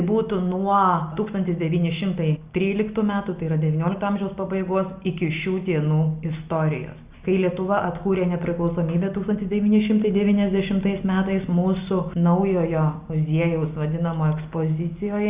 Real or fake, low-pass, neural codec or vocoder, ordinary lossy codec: real; 3.6 kHz; none; Opus, 64 kbps